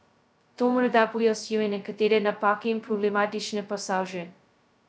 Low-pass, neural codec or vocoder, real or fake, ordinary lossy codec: none; codec, 16 kHz, 0.2 kbps, FocalCodec; fake; none